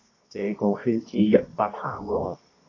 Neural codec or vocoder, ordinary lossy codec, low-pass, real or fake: codec, 24 kHz, 0.9 kbps, WavTokenizer, medium music audio release; AAC, 32 kbps; 7.2 kHz; fake